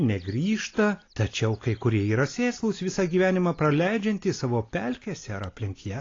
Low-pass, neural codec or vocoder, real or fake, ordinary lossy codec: 7.2 kHz; none; real; AAC, 32 kbps